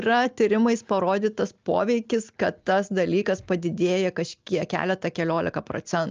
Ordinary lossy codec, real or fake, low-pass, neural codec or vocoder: Opus, 24 kbps; real; 7.2 kHz; none